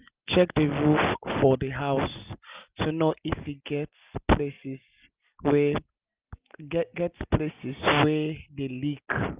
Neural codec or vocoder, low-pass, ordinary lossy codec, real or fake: none; 3.6 kHz; Opus, 32 kbps; real